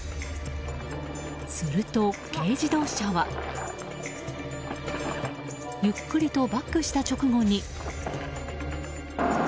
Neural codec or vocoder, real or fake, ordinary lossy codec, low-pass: none; real; none; none